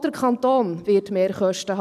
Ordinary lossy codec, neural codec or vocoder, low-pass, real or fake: none; none; 14.4 kHz; real